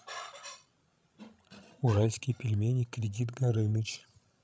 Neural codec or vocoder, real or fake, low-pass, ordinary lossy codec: codec, 16 kHz, 16 kbps, FreqCodec, larger model; fake; none; none